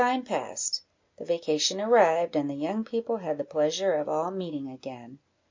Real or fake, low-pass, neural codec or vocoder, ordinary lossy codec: real; 7.2 kHz; none; MP3, 48 kbps